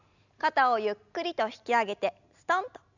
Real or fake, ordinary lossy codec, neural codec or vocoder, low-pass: real; none; none; 7.2 kHz